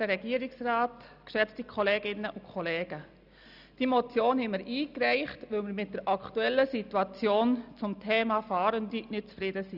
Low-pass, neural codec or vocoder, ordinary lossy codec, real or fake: 5.4 kHz; none; none; real